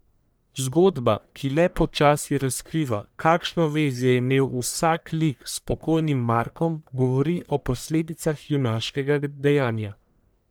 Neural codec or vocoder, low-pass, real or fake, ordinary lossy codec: codec, 44.1 kHz, 1.7 kbps, Pupu-Codec; none; fake; none